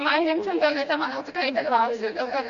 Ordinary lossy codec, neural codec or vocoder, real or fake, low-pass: AAC, 64 kbps; codec, 16 kHz, 1 kbps, FreqCodec, smaller model; fake; 7.2 kHz